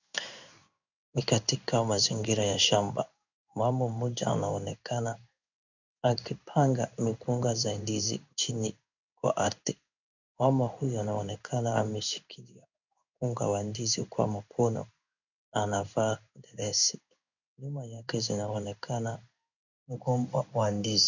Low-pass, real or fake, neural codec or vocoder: 7.2 kHz; fake; codec, 16 kHz in and 24 kHz out, 1 kbps, XY-Tokenizer